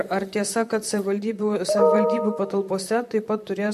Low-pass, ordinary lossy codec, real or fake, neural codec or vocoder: 14.4 kHz; MP3, 64 kbps; fake; vocoder, 44.1 kHz, 128 mel bands, Pupu-Vocoder